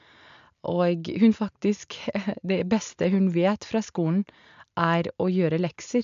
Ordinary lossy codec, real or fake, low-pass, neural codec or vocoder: MP3, 64 kbps; real; 7.2 kHz; none